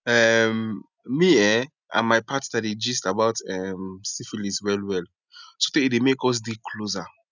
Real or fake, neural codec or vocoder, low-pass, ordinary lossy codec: real; none; 7.2 kHz; none